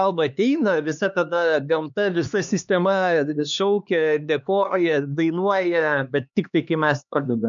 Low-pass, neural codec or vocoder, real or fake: 7.2 kHz; codec, 16 kHz, 2 kbps, X-Codec, HuBERT features, trained on LibriSpeech; fake